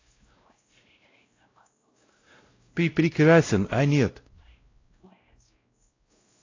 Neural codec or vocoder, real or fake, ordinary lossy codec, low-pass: codec, 16 kHz, 0.5 kbps, X-Codec, WavLM features, trained on Multilingual LibriSpeech; fake; AAC, 32 kbps; 7.2 kHz